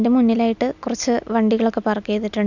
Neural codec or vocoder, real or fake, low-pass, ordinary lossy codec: none; real; 7.2 kHz; none